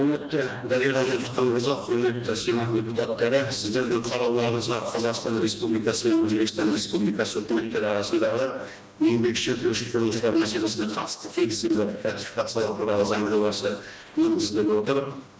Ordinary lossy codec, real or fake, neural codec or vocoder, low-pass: none; fake; codec, 16 kHz, 1 kbps, FreqCodec, smaller model; none